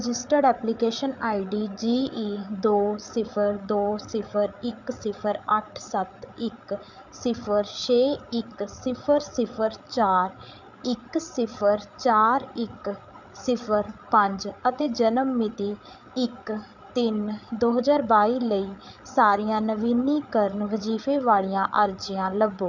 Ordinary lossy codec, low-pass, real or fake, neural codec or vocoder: none; 7.2 kHz; fake; codec, 16 kHz, 8 kbps, FreqCodec, larger model